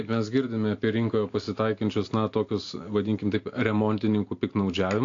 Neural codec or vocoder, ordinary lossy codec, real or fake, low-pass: none; AAC, 48 kbps; real; 7.2 kHz